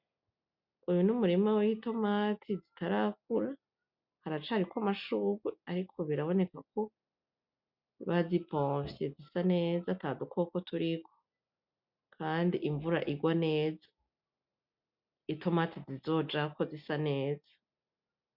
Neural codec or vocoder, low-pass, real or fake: none; 5.4 kHz; real